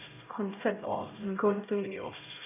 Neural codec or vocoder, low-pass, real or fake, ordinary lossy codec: codec, 16 kHz, 0.5 kbps, X-Codec, HuBERT features, trained on LibriSpeech; 3.6 kHz; fake; none